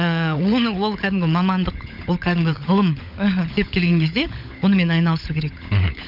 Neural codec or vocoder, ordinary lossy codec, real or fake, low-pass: codec, 16 kHz, 16 kbps, FunCodec, trained on LibriTTS, 50 frames a second; none; fake; 5.4 kHz